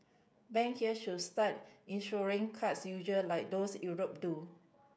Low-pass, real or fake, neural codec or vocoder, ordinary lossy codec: none; fake; codec, 16 kHz, 16 kbps, FreqCodec, smaller model; none